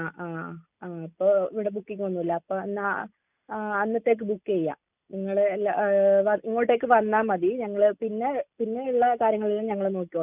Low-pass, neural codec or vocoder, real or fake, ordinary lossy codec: 3.6 kHz; none; real; none